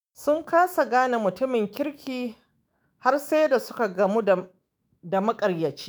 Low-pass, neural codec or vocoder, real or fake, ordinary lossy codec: none; autoencoder, 48 kHz, 128 numbers a frame, DAC-VAE, trained on Japanese speech; fake; none